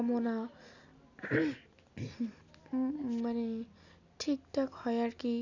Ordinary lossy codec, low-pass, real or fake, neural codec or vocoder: none; 7.2 kHz; real; none